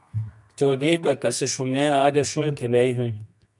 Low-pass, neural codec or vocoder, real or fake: 10.8 kHz; codec, 24 kHz, 0.9 kbps, WavTokenizer, medium music audio release; fake